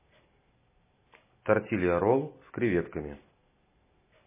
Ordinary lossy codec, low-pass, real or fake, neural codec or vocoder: MP3, 16 kbps; 3.6 kHz; real; none